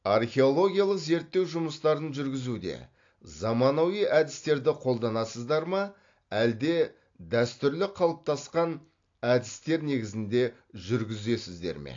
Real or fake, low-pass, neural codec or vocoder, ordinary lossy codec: real; 7.2 kHz; none; AAC, 48 kbps